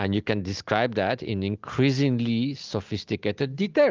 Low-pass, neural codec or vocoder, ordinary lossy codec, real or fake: 7.2 kHz; none; Opus, 32 kbps; real